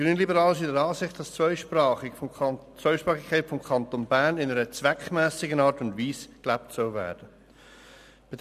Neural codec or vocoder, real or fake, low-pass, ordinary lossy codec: none; real; 14.4 kHz; none